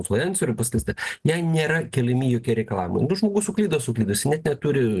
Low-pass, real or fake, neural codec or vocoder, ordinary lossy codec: 10.8 kHz; real; none; Opus, 16 kbps